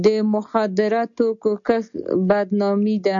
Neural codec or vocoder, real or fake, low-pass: none; real; 7.2 kHz